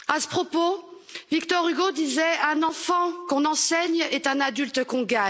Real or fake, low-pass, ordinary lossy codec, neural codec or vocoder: real; none; none; none